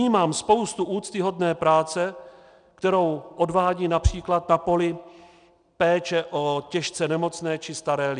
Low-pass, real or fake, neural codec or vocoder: 9.9 kHz; real; none